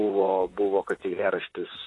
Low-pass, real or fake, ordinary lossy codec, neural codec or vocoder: 10.8 kHz; fake; AAC, 32 kbps; vocoder, 44.1 kHz, 128 mel bands, Pupu-Vocoder